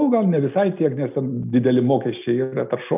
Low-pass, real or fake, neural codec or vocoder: 3.6 kHz; real; none